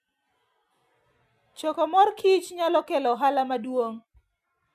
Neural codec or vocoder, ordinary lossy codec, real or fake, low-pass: none; none; real; 14.4 kHz